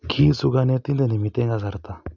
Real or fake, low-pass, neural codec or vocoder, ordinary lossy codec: real; 7.2 kHz; none; none